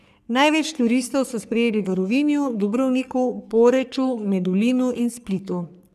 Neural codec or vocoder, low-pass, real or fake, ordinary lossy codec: codec, 44.1 kHz, 3.4 kbps, Pupu-Codec; 14.4 kHz; fake; none